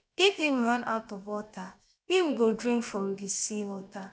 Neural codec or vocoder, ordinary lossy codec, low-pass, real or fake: codec, 16 kHz, 0.7 kbps, FocalCodec; none; none; fake